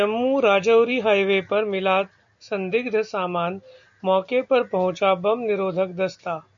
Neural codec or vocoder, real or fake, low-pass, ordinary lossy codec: none; real; 7.2 kHz; MP3, 48 kbps